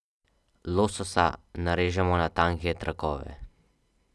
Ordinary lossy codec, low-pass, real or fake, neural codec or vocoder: none; none; real; none